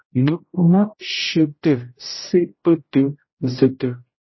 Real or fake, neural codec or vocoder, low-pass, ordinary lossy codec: fake; codec, 16 kHz, 0.5 kbps, X-Codec, HuBERT features, trained on general audio; 7.2 kHz; MP3, 24 kbps